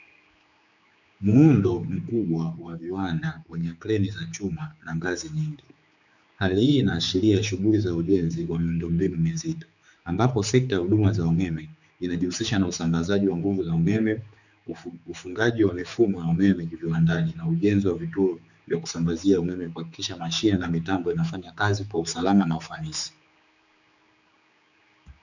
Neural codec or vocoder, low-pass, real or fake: codec, 16 kHz, 4 kbps, X-Codec, HuBERT features, trained on general audio; 7.2 kHz; fake